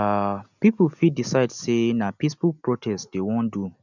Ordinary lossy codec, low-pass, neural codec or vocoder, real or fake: none; 7.2 kHz; none; real